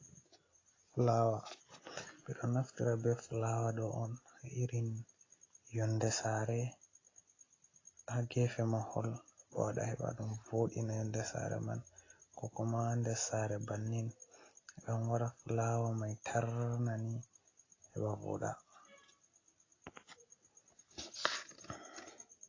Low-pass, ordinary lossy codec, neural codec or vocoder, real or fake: 7.2 kHz; AAC, 32 kbps; none; real